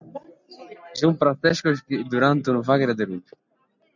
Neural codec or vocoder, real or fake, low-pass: none; real; 7.2 kHz